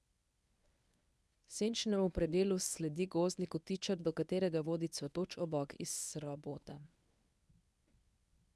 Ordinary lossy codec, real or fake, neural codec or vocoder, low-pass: none; fake; codec, 24 kHz, 0.9 kbps, WavTokenizer, medium speech release version 1; none